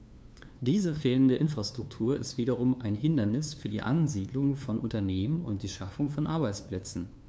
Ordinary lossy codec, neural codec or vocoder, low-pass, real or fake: none; codec, 16 kHz, 2 kbps, FunCodec, trained on LibriTTS, 25 frames a second; none; fake